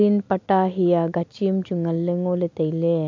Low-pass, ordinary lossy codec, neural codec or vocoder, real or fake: 7.2 kHz; MP3, 64 kbps; none; real